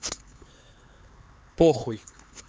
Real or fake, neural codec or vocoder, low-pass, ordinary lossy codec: fake; codec, 16 kHz, 4 kbps, X-Codec, HuBERT features, trained on LibriSpeech; none; none